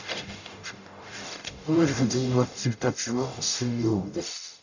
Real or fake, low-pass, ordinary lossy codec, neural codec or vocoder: fake; 7.2 kHz; none; codec, 44.1 kHz, 0.9 kbps, DAC